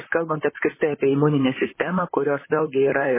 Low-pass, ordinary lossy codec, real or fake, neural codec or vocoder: 3.6 kHz; MP3, 16 kbps; fake; vocoder, 44.1 kHz, 128 mel bands, Pupu-Vocoder